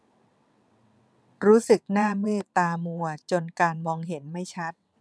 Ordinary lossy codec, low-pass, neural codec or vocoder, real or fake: none; none; vocoder, 22.05 kHz, 80 mel bands, WaveNeXt; fake